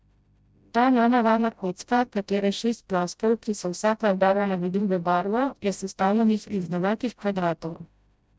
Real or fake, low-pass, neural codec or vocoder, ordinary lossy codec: fake; none; codec, 16 kHz, 0.5 kbps, FreqCodec, smaller model; none